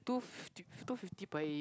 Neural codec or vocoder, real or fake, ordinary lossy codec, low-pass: none; real; none; none